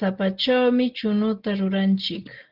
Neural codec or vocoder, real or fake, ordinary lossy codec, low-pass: none; real; Opus, 16 kbps; 5.4 kHz